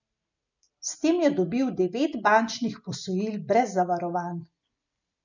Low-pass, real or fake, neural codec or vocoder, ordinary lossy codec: 7.2 kHz; real; none; none